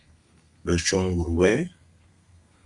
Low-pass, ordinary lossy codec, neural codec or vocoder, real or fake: 10.8 kHz; Opus, 64 kbps; codec, 32 kHz, 1.9 kbps, SNAC; fake